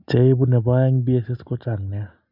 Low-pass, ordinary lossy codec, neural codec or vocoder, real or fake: 5.4 kHz; none; none; real